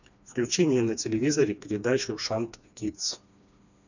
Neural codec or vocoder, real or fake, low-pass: codec, 16 kHz, 2 kbps, FreqCodec, smaller model; fake; 7.2 kHz